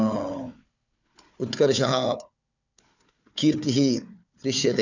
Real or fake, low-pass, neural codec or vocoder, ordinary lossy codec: fake; 7.2 kHz; codec, 16 kHz, 4 kbps, FunCodec, trained on Chinese and English, 50 frames a second; none